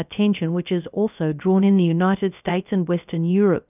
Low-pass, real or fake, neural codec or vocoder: 3.6 kHz; fake; codec, 16 kHz, 0.3 kbps, FocalCodec